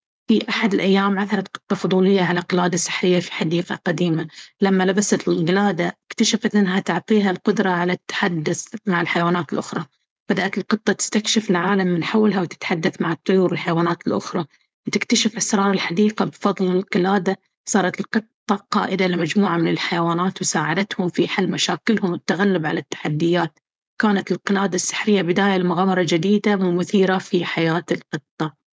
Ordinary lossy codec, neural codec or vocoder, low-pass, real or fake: none; codec, 16 kHz, 4.8 kbps, FACodec; none; fake